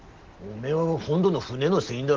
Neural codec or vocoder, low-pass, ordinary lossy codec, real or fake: none; 7.2 kHz; Opus, 16 kbps; real